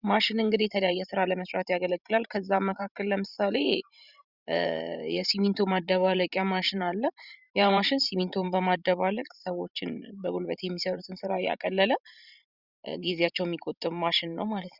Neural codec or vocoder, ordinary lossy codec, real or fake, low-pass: vocoder, 44.1 kHz, 128 mel bands every 512 samples, BigVGAN v2; Opus, 64 kbps; fake; 5.4 kHz